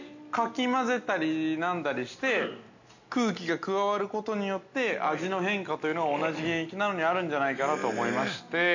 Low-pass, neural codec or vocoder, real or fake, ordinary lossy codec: 7.2 kHz; none; real; none